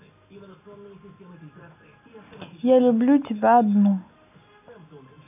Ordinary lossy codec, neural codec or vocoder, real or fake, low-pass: none; none; real; 3.6 kHz